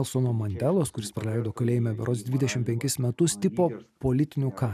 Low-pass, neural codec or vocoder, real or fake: 14.4 kHz; none; real